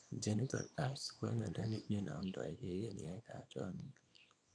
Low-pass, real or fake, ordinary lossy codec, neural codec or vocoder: 9.9 kHz; fake; none; codec, 24 kHz, 0.9 kbps, WavTokenizer, small release